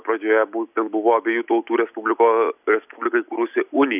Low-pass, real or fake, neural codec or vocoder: 3.6 kHz; real; none